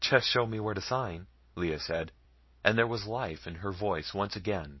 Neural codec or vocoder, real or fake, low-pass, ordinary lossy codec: none; real; 7.2 kHz; MP3, 24 kbps